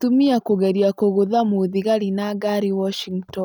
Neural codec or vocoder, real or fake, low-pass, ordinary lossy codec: none; real; none; none